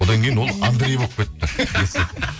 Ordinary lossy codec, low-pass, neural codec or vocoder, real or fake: none; none; none; real